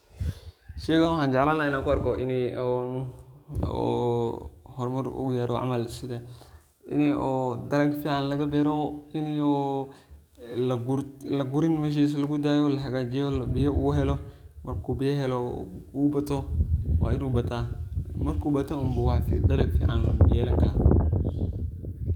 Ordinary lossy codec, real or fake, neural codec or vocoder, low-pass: none; fake; codec, 44.1 kHz, 7.8 kbps, DAC; 19.8 kHz